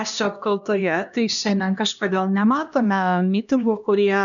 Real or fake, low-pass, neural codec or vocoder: fake; 7.2 kHz; codec, 16 kHz, 1 kbps, X-Codec, HuBERT features, trained on LibriSpeech